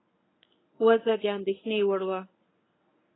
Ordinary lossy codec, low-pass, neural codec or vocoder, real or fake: AAC, 16 kbps; 7.2 kHz; codec, 24 kHz, 0.9 kbps, WavTokenizer, medium speech release version 1; fake